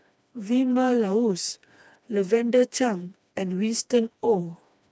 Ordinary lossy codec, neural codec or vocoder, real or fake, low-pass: none; codec, 16 kHz, 2 kbps, FreqCodec, smaller model; fake; none